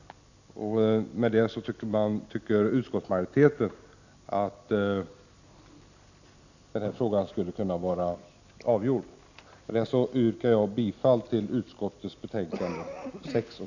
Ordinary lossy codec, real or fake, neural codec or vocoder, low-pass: none; real; none; 7.2 kHz